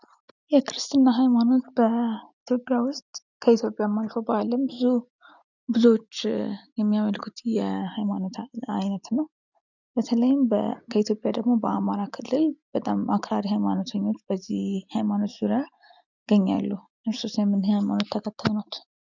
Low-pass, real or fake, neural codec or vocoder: 7.2 kHz; real; none